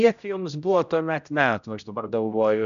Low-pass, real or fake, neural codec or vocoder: 7.2 kHz; fake; codec, 16 kHz, 0.5 kbps, X-Codec, HuBERT features, trained on general audio